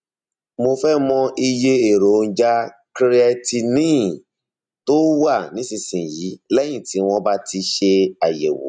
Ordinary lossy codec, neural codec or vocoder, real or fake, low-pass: none; none; real; 9.9 kHz